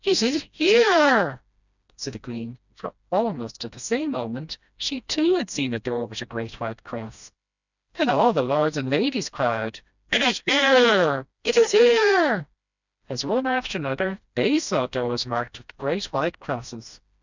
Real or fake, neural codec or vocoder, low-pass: fake; codec, 16 kHz, 1 kbps, FreqCodec, smaller model; 7.2 kHz